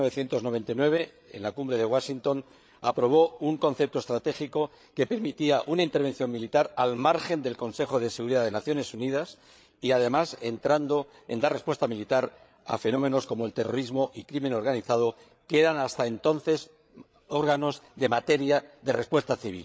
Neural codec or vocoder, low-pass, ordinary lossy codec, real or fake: codec, 16 kHz, 8 kbps, FreqCodec, larger model; none; none; fake